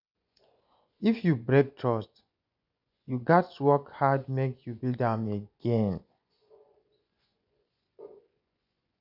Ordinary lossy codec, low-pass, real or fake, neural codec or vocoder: none; 5.4 kHz; fake; vocoder, 24 kHz, 100 mel bands, Vocos